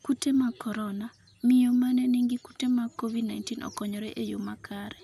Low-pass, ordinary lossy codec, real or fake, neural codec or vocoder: 14.4 kHz; none; real; none